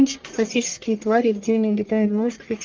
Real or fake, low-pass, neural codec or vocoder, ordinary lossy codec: fake; 7.2 kHz; codec, 44.1 kHz, 1.7 kbps, Pupu-Codec; Opus, 32 kbps